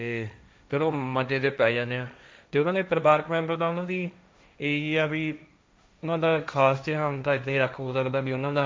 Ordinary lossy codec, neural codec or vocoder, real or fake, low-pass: none; codec, 16 kHz, 1.1 kbps, Voila-Tokenizer; fake; none